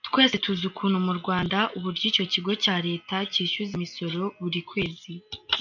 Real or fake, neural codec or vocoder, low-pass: real; none; 7.2 kHz